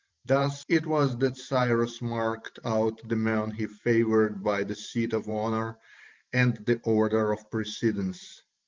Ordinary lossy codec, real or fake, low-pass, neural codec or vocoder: Opus, 32 kbps; fake; 7.2 kHz; vocoder, 44.1 kHz, 128 mel bands every 512 samples, BigVGAN v2